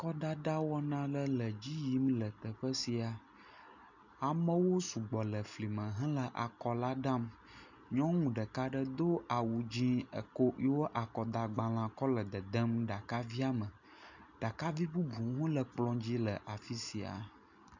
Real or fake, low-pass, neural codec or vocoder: real; 7.2 kHz; none